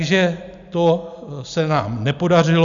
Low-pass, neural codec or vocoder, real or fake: 7.2 kHz; none; real